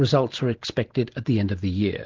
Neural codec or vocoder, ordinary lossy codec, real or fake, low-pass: none; Opus, 16 kbps; real; 7.2 kHz